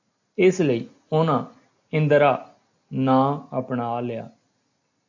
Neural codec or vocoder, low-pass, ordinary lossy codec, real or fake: none; 7.2 kHz; AAC, 48 kbps; real